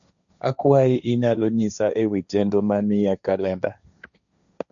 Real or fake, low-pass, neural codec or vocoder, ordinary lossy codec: fake; 7.2 kHz; codec, 16 kHz, 1.1 kbps, Voila-Tokenizer; none